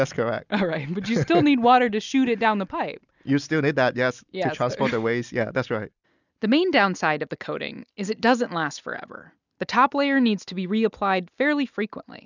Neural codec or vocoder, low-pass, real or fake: none; 7.2 kHz; real